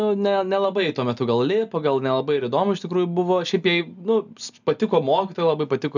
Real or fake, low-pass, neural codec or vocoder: real; 7.2 kHz; none